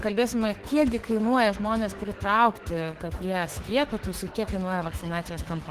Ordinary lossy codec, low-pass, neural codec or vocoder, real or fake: Opus, 16 kbps; 14.4 kHz; autoencoder, 48 kHz, 32 numbers a frame, DAC-VAE, trained on Japanese speech; fake